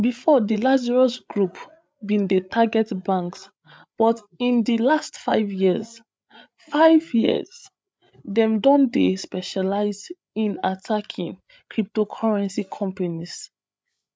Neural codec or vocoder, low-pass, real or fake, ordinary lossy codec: codec, 16 kHz, 8 kbps, FreqCodec, larger model; none; fake; none